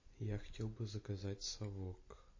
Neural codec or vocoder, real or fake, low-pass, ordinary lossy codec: none; real; 7.2 kHz; MP3, 32 kbps